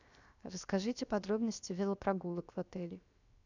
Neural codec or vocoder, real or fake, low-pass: codec, 16 kHz, 0.7 kbps, FocalCodec; fake; 7.2 kHz